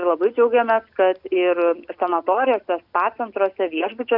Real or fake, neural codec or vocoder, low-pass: real; none; 5.4 kHz